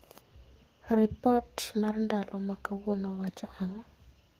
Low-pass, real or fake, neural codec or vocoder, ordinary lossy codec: 14.4 kHz; fake; codec, 32 kHz, 1.9 kbps, SNAC; Opus, 24 kbps